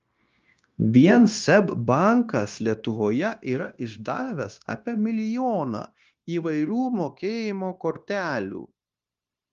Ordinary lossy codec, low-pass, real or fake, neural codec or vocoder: Opus, 32 kbps; 7.2 kHz; fake; codec, 16 kHz, 0.9 kbps, LongCat-Audio-Codec